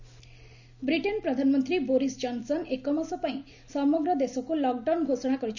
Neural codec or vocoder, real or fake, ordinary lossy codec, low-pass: none; real; none; 7.2 kHz